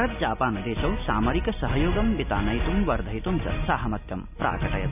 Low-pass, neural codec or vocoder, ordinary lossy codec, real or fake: 3.6 kHz; none; none; real